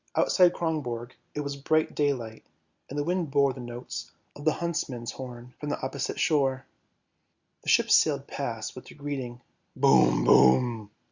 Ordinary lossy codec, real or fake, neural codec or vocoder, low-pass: Opus, 64 kbps; real; none; 7.2 kHz